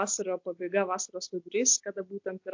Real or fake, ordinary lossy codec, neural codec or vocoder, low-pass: real; MP3, 48 kbps; none; 7.2 kHz